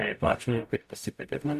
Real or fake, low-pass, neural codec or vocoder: fake; 14.4 kHz; codec, 44.1 kHz, 0.9 kbps, DAC